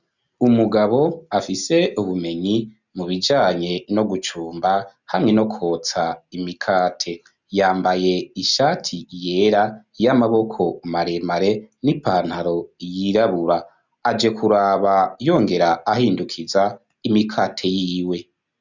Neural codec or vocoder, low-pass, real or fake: none; 7.2 kHz; real